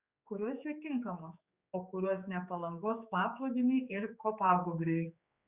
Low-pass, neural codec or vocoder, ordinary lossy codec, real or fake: 3.6 kHz; codec, 16 kHz, 4 kbps, X-Codec, HuBERT features, trained on general audio; Opus, 64 kbps; fake